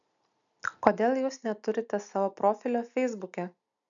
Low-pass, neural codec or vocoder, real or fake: 7.2 kHz; none; real